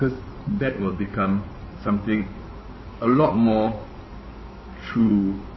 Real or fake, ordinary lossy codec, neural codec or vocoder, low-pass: fake; MP3, 24 kbps; codec, 16 kHz in and 24 kHz out, 2.2 kbps, FireRedTTS-2 codec; 7.2 kHz